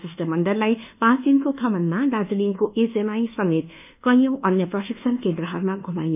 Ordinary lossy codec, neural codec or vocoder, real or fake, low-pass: none; codec, 24 kHz, 1.2 kbps, DualCodec; fake; 3.6 kHz